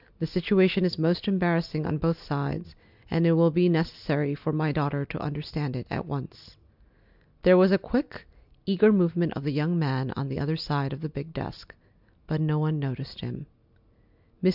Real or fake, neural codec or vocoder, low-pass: real; none; 5.4 kHz